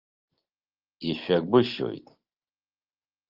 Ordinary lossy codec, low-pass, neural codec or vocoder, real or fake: Opus, 24 kbps; 5.4 kHz; none; real